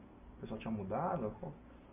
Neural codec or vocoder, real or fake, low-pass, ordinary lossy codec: none; real; 3.6 kHz; MP3, 32 kbps